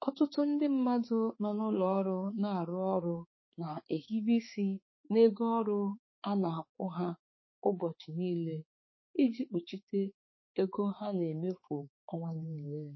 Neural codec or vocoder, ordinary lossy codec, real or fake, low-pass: codec, 16 kHz, 4 kbps, X-Codec, HuBERT features, trained on balanced general audio; MP3, 24 kbps; fake; 7.2 kHz